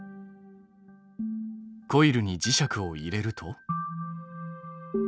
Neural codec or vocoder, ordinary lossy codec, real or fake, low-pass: none; none; real; none